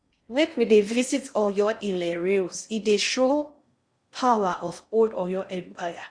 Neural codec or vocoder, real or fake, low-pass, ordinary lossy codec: codec, 16 kHz in and 24 kHz out, 0.6 kbps, FocalCodec, streaming, 2048 codes; fake; 9.9 kHz; Opus, 64 kbps